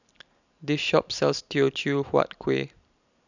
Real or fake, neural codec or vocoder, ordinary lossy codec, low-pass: real; none; none; 7.2 kHz